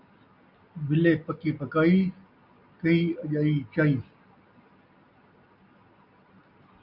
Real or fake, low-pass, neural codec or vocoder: real; 5.4 kHz; none